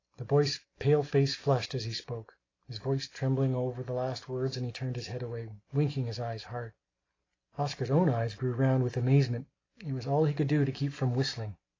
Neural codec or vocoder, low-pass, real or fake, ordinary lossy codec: none; 7.2 kHz; real; AAC, 32 kbps